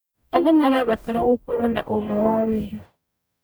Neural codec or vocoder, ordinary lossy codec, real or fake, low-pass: codec, 44.1 kHz, 0.9 kbps, DAC; none; fake; none